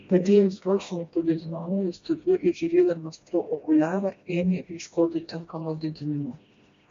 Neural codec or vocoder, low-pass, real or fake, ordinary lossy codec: codec, 16 kHz, 1 kbps, FreqCodec, smaller model; 7.2 kHz; fake; MP3, 48 kbps